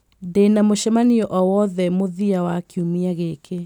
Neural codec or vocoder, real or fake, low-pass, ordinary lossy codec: none; real; 19.8 kHz; none